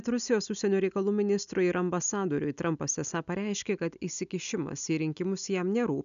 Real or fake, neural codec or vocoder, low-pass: real; none; 7.2 kHz